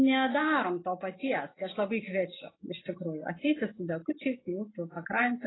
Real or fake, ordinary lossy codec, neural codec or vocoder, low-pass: real; AAC, 16 kbps; none; 7.2 kHz